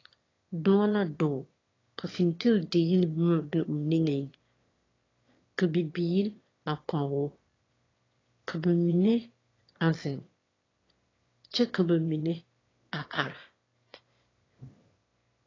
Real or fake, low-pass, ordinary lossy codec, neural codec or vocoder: fake; 7.2 kHz; AAC, 32 kbps; autoencoder, 22.05 kHz, a latent of 192 numbers a frame, VITS, trained on one speaker